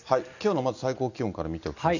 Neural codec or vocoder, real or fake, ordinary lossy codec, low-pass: none; real; none; 7.2 kHz